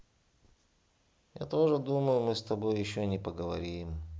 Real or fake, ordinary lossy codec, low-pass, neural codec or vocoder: real; none; none; none